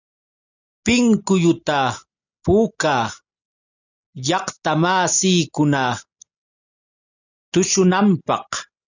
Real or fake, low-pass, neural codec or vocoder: real; 7.2 kHz; none